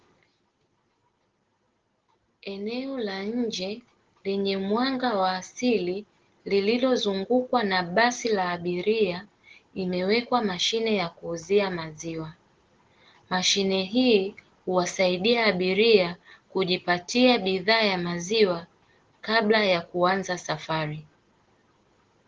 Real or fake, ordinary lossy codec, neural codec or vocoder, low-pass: real; Opus, 16 kbps; none; 7.2 kHz